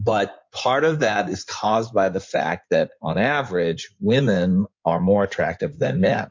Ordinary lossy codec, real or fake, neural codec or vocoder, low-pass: MP3, 48 kbps; fake; codec, 16 kHz in and 24 kHz out, 2.2 kbps, FireRedTTS-2 codec; 7.2 kHz